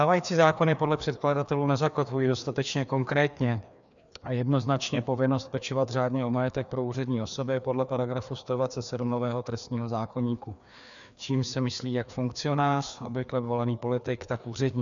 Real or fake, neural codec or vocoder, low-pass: fake; codec, 16 kHz, 2 kbps, FreqCodec, larger model; 7.2 kHz